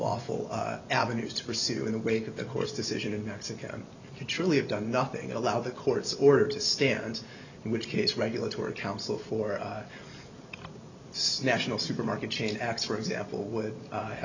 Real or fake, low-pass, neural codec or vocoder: real; 7.2 kHz; none